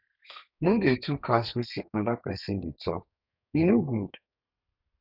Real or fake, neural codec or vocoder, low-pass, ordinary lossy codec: fake; codec, 32 kHz, 1.9 kbps, SNAC; 5.4 kHz; none